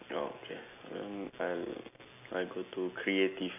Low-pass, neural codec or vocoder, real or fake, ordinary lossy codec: 3.6 kHz; none; real; none